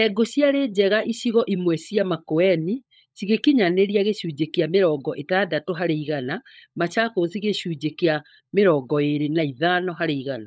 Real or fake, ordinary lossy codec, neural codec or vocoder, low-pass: fake; none; codec, 16 kHz, 16 kbps, FunCodec, trained on LibriTTS, 50 frames a second; none